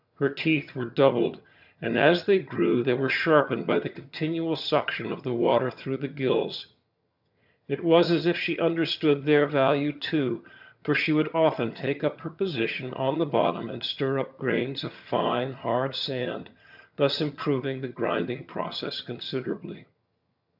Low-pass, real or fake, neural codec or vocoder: 5.4 kHz; fake; vocoder, 22.05 kHz, 80 mel bands, HiFi-GAN